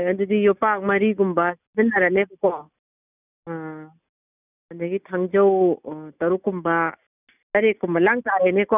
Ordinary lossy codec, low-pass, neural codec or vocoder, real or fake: none; 3.6 kHz; none; real